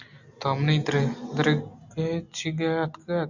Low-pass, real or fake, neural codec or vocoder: 7.2 kHz; real; none